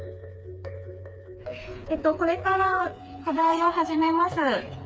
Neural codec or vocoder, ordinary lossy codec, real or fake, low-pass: codec, 16 kHz, 4 kbps, FreqCodec, smaller model; none; fake; none